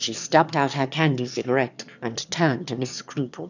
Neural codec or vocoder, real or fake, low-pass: autoencoder, 22.05 kHz, a latent of 192 numbers a frame, VITS, trained on one speaker; fake; 7.2 kHz